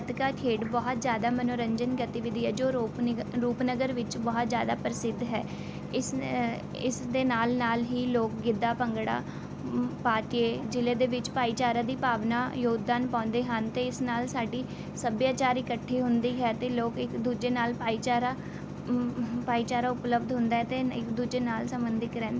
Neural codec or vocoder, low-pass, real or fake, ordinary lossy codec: none; none; real; none